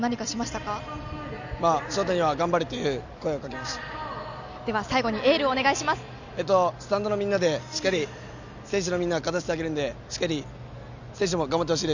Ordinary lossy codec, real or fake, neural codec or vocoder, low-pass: none; real; none; 7.2 kHz